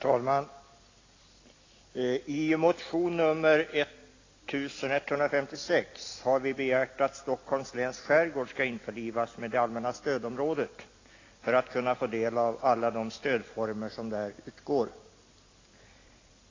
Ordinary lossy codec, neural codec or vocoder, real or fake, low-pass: AAC, 32 kbps; none; real; 7.2 kHz